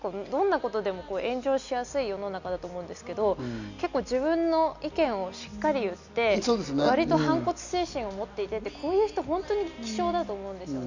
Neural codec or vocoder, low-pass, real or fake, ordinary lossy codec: none; 7.2 kHz; real; none